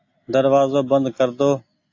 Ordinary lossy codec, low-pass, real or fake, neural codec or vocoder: AAC, 48 kbps; 7.2 kHz; real; none